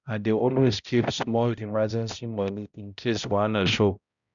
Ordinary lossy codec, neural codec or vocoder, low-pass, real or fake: none; codec, 16 kHz, 0.5 kbps, X-Codec, HuBERT features, trained on balanced general audio; 7.2 kHz; fake